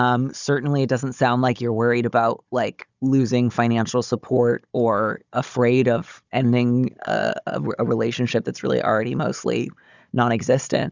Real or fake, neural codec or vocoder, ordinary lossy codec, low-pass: fake; codec, 16 kHz, 16 kbps, FunCodec, trained on Chinese and English, 50 frames a second; Opus, 64 kbps; 7.2 kHz